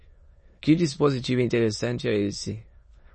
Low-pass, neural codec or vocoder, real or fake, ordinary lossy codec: 9.9 kHz; autoencoder, 22.05 kHz, a latent of 192 numbers a frame, VITS, trained on many speakers; fake; MP3, 32 kbps